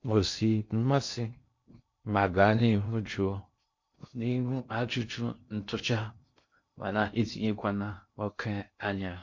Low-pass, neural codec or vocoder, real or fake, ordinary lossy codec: 7.2 kHz; codec, 16 kHz in and 24 kHz out, 0.6 kbps, FocalCodec, streaming, 2048 codes; fake; MP3, 48 kbps